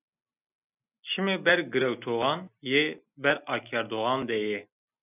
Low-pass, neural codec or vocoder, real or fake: 3.6 kHz; none; real